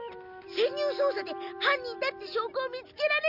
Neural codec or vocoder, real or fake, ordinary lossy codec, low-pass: none; real; none; 5.4 kHz